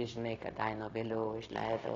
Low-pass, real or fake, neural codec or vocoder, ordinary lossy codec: 7.2 kHz; fake; codec, 16 kHz, 0.9 kbps, LongCat-Audio-Codec; AAC, 32 kbps